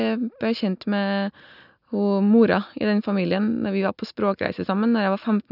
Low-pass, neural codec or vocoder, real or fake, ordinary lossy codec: 5.4 kHz; none; real; none